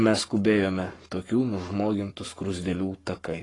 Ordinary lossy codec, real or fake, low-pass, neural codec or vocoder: AAC, 32 kbps; fake; 10.8 kHz; codec, 44.1 kHz, 7.8 kbps, Pupu-Codec